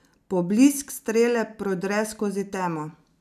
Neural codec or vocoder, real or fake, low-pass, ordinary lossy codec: none; real; 14.4 kHz; none